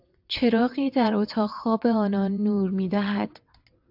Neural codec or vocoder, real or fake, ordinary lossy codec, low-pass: vocoder, 22.05 kHz, 80 mel bands, WaveNeXt; fake; AAC, 48 kbps; 5.4 kHz